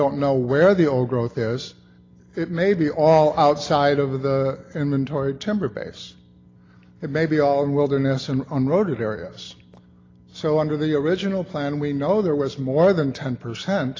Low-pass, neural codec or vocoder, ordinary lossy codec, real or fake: 7.2 kHz; none; AAC, 32 kbps; real